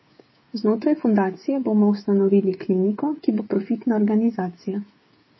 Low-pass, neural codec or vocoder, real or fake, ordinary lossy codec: 7.2 kHz; codec, 16 kHz, 8 kbps, FreqCodec, smaller model; fake; MP3, 24 kbps